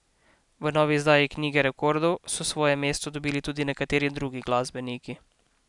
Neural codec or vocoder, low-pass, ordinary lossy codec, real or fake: none; 10.8 kHz; none; real